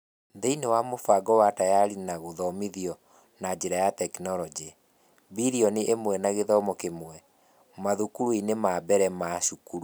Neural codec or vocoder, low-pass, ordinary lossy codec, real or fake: none; none; none; real